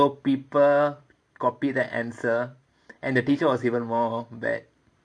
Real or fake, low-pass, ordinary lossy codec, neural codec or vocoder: fake; 9.9 kHz; AAC, 64 kbps; vocoder, 44.1 kHz, 128 mel bands every 512 samples, BigVGAN v2